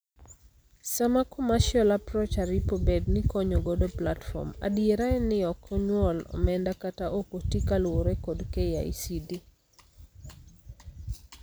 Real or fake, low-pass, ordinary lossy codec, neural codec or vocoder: real; none; none; none